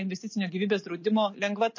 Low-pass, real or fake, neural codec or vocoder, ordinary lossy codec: 7.2 kHz; real; none; MP3, 32 kbps